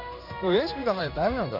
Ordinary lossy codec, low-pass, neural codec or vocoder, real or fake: AAC, 48 kbps; 5.4 kHz; codec, 16 kHz in and 24 kHz out, 1 kbps, XY-Tokenizer; fake